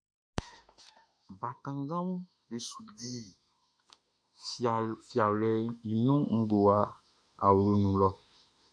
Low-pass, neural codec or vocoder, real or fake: 9.9 kHz; autoencoder, 48 kHz, 32 numbers a frame, DAC-VAE, trained on Japanese speech; fake